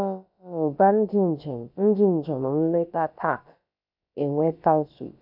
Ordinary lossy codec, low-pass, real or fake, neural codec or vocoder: AAC, 48 kbps; 5.4 kHz; fake; codec, 16 kHz, about 1 kbps, DyCAST, with the encoder's durations